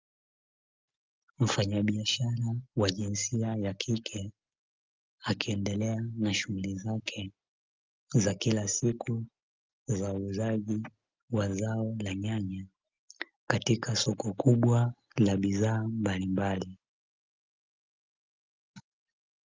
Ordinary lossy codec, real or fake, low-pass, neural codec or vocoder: Opus, 24 kbps; real; 7.2 kHz; none